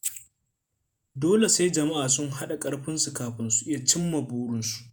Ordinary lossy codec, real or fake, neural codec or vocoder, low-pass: none; fake; vocoder, 48 kHz, 128 mel bands, Vocos; none